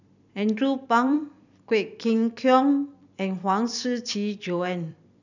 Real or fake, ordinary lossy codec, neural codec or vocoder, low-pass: fake; none; vocoder, 44.1 kHz, 128 mel bands every 256 samples, BigVGAN v2; 7.2 kHz